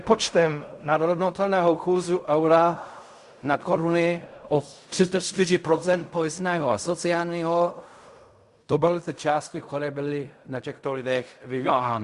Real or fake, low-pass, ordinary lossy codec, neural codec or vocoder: fake; 10.8 kHz; Opus, 64 kbps; codec, 16 kHz in and 24 kHz out, 0.4 kbps, LongCat-Audio-Codec, fine tuned four codebook decoder